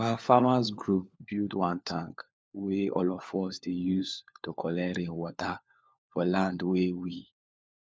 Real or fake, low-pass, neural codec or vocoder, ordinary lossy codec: fake; none; codec, 16 kHz, 4 kbps, FunCodec, trained on LibriTTS, 50 frames a second; none